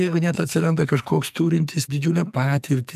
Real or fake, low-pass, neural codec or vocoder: fake; 14.4 kHz; codec, 44.1 kHz, 2.6 kbps, SNAC